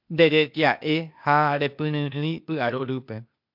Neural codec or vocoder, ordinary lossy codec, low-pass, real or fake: codec, 16 kHz, 0.8 kbps, ZipCodec; MP3, 48 kbps; 5.4 kHz; fake